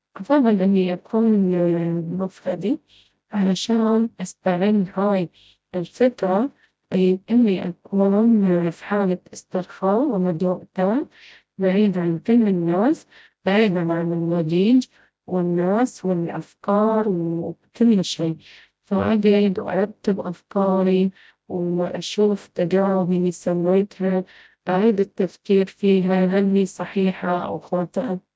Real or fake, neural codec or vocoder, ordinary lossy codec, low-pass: fake; codec, 16 kHz, 0.5 kbps, FreqCodec, smaller model; none; none